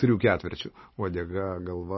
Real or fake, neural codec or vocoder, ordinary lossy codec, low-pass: real; none; MP3, 24 kbps; 7.2 kHz